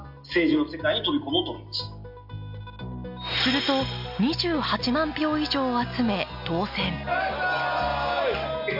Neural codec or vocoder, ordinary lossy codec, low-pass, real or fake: none; AAC, 48 kbps; 5.4 kHz; real